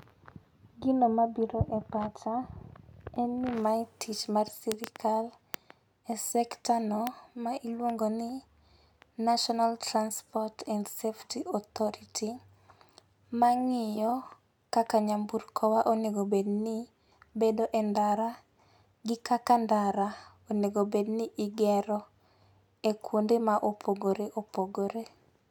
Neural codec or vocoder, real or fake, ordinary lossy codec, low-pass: none; real; none; none